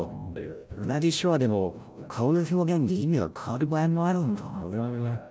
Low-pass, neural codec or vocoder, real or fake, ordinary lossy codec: none; codec, 16 kHz, 0.5 kbps, FreqCodec, larger model; fake; none